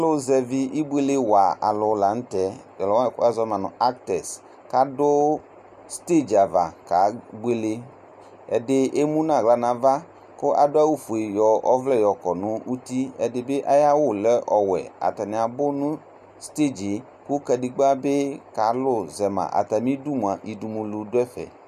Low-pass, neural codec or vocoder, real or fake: 14.4 kHz; none; real